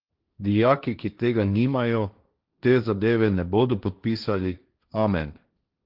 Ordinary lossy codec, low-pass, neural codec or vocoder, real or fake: Opus, 24 kbps; 5.4 kHz; codec, 16 kHz, 1.1 kbps, Voila-Tokenizer; fake